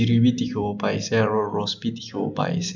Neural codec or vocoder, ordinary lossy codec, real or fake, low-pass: none; none; real; 7.2 kHz